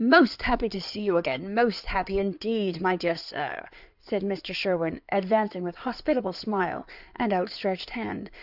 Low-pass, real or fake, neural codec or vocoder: 5.4 kHz; fake; codec, 16 kHz in and 24 kHz out, 2.2 kbps, FireRedTTS-2 codec